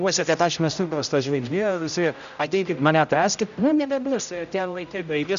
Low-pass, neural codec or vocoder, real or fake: 7.2 kHz; codec, 16 kHz, 0.5 kbps, X-Codec, HuBERT features, trained on general audio; fake